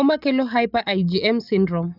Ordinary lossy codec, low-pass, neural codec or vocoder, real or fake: none; 5.4 kHz; none; real